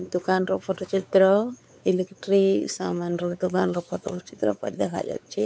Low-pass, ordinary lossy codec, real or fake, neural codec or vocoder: none; none; fake; codec, 16 kHz, 4 kbps, X-Codec, WavLM features, trained on Multilingual LibriSpeech